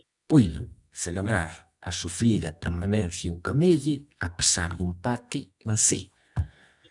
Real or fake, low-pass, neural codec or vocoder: fake; 10.8 kHz; codec, 24 kHz, 0.9 kbps, WavTokenizer, medium music audio release